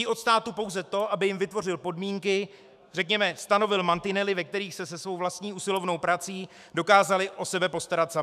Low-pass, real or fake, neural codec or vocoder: 14.4 kHz; fake; autoencoder, 48 kHz, 128 numbers a frame, DAC-VAE, trained on Japanese speech